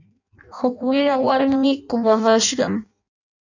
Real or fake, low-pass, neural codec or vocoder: fake; 7.2 kHz; codec, 16 kHz in and 24 kHz out, 0.6 kbps, FireRedTTS-2 codec